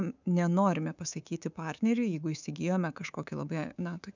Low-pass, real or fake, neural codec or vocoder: 7.2 kHz; fake; codec, 24 kHz, 3.1 kbps, DualCodec